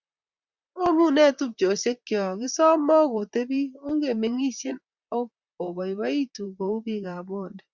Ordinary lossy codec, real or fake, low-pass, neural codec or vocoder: Opus, 64 kbps; fake; 7.2 kHz; vocoder, 44.1 kHz, 128 mel bands, Pupu-Vocoder